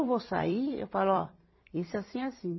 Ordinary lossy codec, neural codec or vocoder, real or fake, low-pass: MP3, 24 kbps; vocoder, 44.1 kHz, 128 mel bands every 256 samples, BigVGAN v2; fake; 7.2 kHz